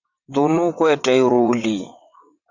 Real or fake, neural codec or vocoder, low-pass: fake; vocoder, 22.05 kHz, 80 mel bands, WaveNeXt; 7.2 kHz